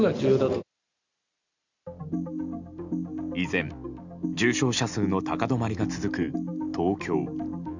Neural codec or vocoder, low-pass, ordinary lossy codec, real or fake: none; 7.2 kHz; none; real